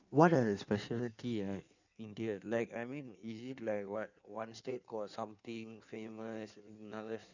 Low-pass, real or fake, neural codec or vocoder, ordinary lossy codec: 7.2 kHz; fake; codec, 16 kHz in and 24 kHz out, 1.1 kbps, FireRedTTS-2 codec; none